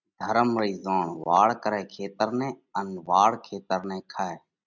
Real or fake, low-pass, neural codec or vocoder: real; 7.2 kHz; none